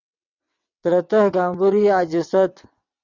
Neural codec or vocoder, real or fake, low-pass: vocoder, 22.05 kHz, 80 mel bands, WaveNeXt; fake; 7.2 kHz